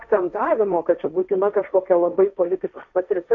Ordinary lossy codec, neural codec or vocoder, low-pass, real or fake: AAC, 64 kbps; codec, 16 kHz, 1.1 kbps, Voila-Tokenizer; 7.2 kHz; fake